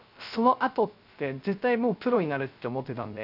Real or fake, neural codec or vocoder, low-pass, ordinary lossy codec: fake; codec, 16 kHz, 0.3 kbps, FocalCodec; 5.4 kHz; none